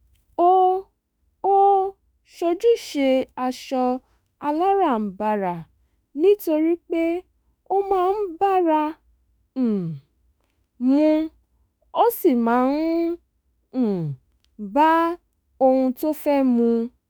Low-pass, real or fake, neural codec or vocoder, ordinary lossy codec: none; fake; autoencoder, 48 kHz, 32 numbers a frame, DAC-VAE, trained on Japanese speech; none